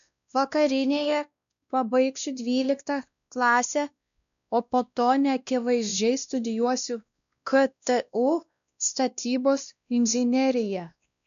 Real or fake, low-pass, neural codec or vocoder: fake; 7.2 kHz; codec, 16 kHz, 1 kbps, X-Codec, WavLM features, trained on Multilingual LibriSpeech